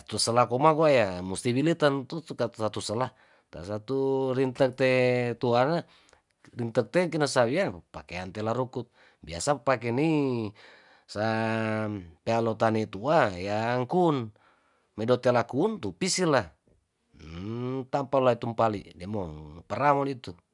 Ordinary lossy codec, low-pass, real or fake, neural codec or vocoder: none; 10.8 kHz; real; none